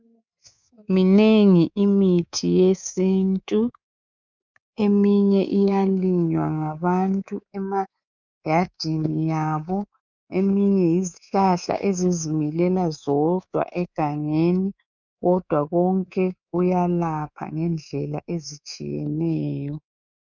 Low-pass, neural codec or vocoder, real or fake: 7.2 kHz; codec, 24 kHz, 3.1 kbps, DualCodec; fake